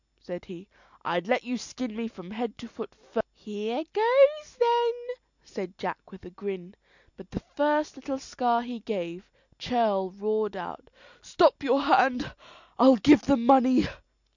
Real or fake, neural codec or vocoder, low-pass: real; none; 7.2 kHz